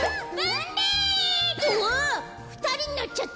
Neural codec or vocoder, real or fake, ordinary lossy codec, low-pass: none; real; none; none